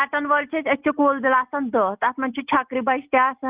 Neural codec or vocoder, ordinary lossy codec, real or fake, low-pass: none; Opus, 24 kbps; real; 3.6 kHz